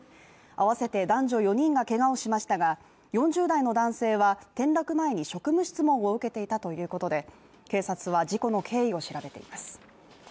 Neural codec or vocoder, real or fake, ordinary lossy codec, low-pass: none; real; none; none